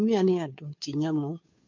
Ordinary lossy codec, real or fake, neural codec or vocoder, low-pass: MP3, 48 kbps; fake; codec, 16 kHz, 2 kbps, FunCodec, trained on Chinese and English, 25 frames a second; 7.2 kHz